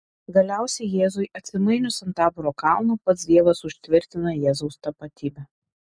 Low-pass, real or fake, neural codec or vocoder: 9.9 kHz; real; none